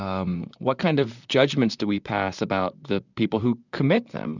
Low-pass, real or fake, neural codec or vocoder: 7.2 kHz; fake; vocoder, 44.1 kHz, 128 mel bands, Pupu-Vocoder